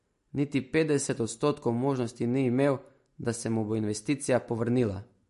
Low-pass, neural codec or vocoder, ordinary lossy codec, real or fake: 14.4 kHz; none; MP3, 48 kbps; real